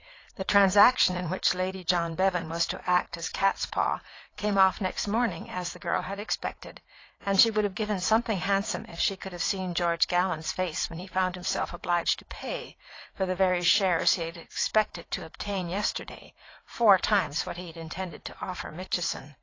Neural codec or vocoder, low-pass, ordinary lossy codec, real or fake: vocoder, 44.1 kHz, 80 mel bands, Vocos; 7.2 kHz; AAC, 32 kbps; fake